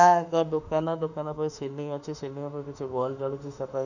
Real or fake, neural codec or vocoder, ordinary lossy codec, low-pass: fake; autoencoder, 48 kHz, 32 numbers a frame, DAC-VAE, trained on Japanese speech; none; 7.2 kHz